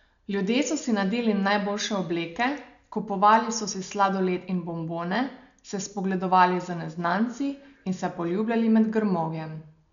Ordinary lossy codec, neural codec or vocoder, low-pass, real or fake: none; none; 7.2 kHz; real